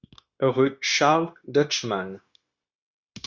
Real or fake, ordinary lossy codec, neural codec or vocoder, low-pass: fake; Opus, 64 kbps; codec, 16 kHz, 0.9 kbps, LongCat-Audio-Codec; 7.2 kHz